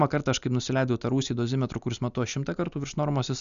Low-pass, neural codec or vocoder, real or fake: 7.2 kHz; none; real